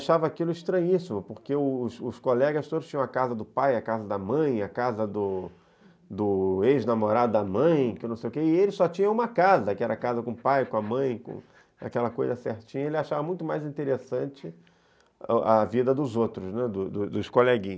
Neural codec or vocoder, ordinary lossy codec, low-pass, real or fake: none; none; none; real